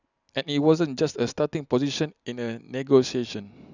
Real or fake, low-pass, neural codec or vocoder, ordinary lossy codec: real; 7.2 kHz; none; none